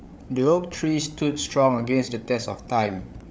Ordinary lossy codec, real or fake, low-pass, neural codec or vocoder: none; fake; none; codec, 16 kHz, 8 kbps, FreqCodec, larger model